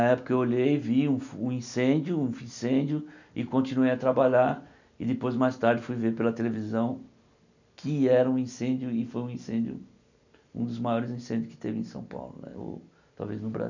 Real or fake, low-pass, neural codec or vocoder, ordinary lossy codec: real; 7.2 kHz; none; none